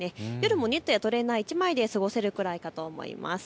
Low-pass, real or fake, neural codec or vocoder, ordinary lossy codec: none; real; none; none